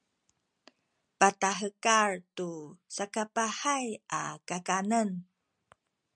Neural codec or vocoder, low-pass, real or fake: none; 9.9 kHz; real